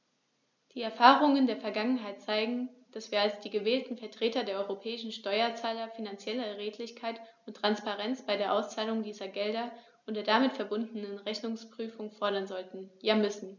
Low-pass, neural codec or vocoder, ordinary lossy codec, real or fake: 7.2 kHz; none; none; real